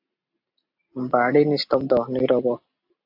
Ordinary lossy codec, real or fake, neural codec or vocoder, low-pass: AAC, 48 kbps; real; none; 5.4 kHz